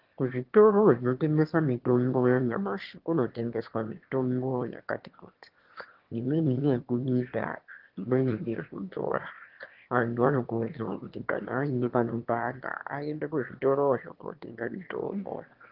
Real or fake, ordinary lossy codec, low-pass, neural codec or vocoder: fake; Opus, 16 kbps; 5.4 kHz; autoencoder, 22.05 kHz, a latent of 192 numbers a frame, VITS, trained on one speaker